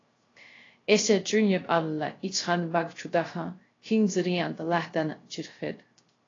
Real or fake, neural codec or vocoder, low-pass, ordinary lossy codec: fake; codec, 16 kHz, 0.3 kbps, FocalCodec; 7.2 kHz; AAC, 32 kbps